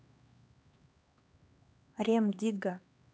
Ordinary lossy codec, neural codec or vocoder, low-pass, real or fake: none; codec, 16 kHz, 2 kbps, X-Codec, HuBERT features, trained on LibriSpeech; none; fake